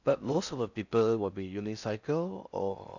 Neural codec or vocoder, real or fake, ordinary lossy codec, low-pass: codec, 16 kHz in and 24 kHz out, 0.6 kbps, FocalCodec, streaming, 4096 codes; fake; none; 7.2 kHz